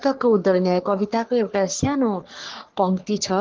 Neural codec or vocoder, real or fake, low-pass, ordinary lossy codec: codec, 44.1 kHz, 3.4 kbps, Pupu-Codec; fake; 7.2 kHz; Opus, 16 kbps